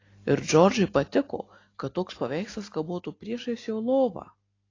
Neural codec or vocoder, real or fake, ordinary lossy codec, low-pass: none; real; AAC, 32 kbps; 7.2 kHz